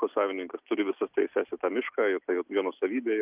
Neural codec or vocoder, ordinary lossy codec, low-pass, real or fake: none; Opus, 24 kbps; 3.6 kHz; real